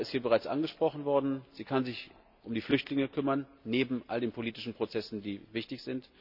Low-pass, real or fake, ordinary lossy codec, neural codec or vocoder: 5.4 kHz; real; none; none